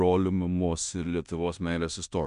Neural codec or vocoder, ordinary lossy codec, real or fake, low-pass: codec, 16 kHz in and 24 kHz out, 0.9 kbps, LongCat-Audio-Codec, fine tuned four codebook decoder; MP3, 96 kbps; fake; 10.8 kHz